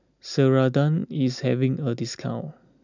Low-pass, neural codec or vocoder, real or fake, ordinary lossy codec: 7.2 kHz; none; real; none